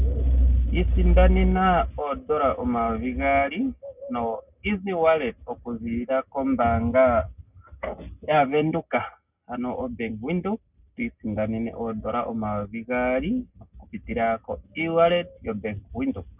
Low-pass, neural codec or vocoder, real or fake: 3.6 kHz; none; real